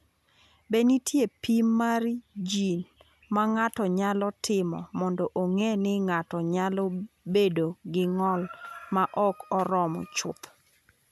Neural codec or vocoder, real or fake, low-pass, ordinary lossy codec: none; real; 14.4 kHz; none